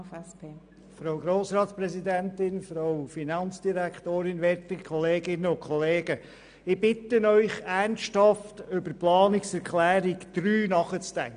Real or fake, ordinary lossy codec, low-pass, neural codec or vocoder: real; none; 9.9 kHz; none